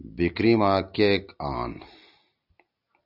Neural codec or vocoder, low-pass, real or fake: none; 5.4 kHz; real